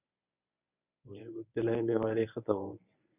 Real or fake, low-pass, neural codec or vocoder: fake; 3.6 kHz; codec, 24 kHz, 0.9 kbps, WavTokenizer, medium speech release version 1